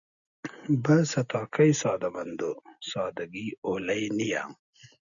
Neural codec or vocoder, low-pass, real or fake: none; 7.2 kHz; real